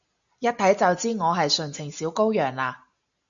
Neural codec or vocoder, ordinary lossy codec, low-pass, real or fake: none; MP3, 96 kbps; 7.2 kHz; real